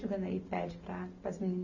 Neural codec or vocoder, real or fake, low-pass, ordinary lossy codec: none; real; 7.2 kHz; none